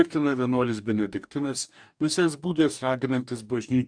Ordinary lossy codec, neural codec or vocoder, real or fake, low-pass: MP3, 96 kbps; codec, 44.1 kHz, 2.6 kbps, DAC; fake; 9.9 kHz